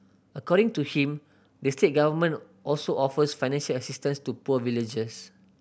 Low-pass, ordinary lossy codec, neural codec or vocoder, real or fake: none; none; none; real